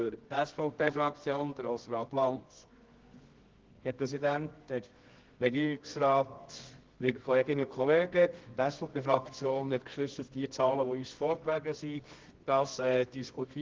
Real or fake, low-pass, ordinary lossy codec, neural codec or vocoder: fake; 7.2 kHz; Opus, 16 kbps; codec, 24 kHz, 0.9 kbps, WavTokenizer, medium music audio release